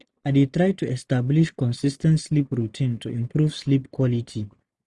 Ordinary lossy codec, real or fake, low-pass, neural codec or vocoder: none; real; none; none